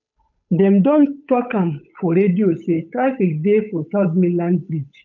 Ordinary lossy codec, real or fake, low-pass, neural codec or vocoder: none; fake; 7.2 kHz; codec, 16 kHz, 8 kbps, FunCodec, trained on Chinese and English, 25 frames a second